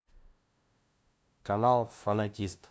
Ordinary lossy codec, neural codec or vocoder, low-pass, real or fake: none; codec, 16 kHz, 0.5 kbps, FunCodec, trained on LibriTTS, 25 frames a second; none; fake